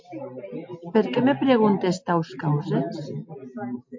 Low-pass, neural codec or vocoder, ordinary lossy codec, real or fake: 7.2 kHz; none; MP3, 48 kbps; real